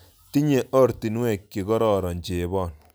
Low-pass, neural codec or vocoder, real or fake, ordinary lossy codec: none; none; real; none